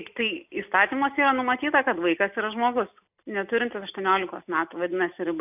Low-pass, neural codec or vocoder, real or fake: 3.6 kHz; none; real